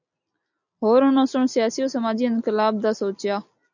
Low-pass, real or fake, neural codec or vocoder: 7.2 kHz; real; none